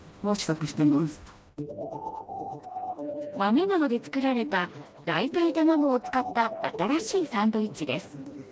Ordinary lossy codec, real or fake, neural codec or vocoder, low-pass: none; fake; codec, 16 kHz, 1 kbps, FreqCodec, smaller model; none